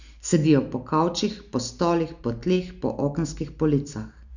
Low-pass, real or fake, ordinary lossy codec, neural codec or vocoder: 7.2 kHz; real; none; none